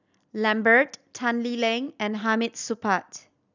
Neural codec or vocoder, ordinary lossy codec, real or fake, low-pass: vocoder, 44.1 kHz, 128 mel bands every 256 samples, BigVGAN v2; none; fake; 7.2 kHz